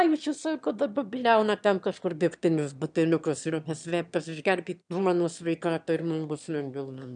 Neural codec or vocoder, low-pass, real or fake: autoencoder, 22.05 kHz, a latent of 192 numbers a frame, VITS, trained on one speaker; 9.9 kHz; fake